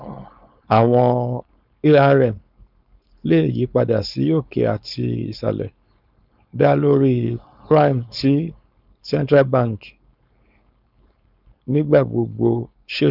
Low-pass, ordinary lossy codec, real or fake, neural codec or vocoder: 5.4 kHz; none; fake; codec, 16 kHz, 4.8 kbps, FACodec